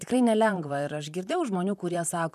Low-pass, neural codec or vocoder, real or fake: 14.4 kHz; vocoder, 44.1 kHz, 128 mel bands, Pupu-Vocoder; fake